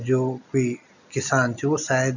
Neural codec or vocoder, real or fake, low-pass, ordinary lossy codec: none; real; 7.2 kHz; none